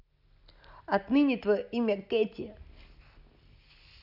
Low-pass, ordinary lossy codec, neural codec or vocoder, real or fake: 5.4 kHz; none; none; real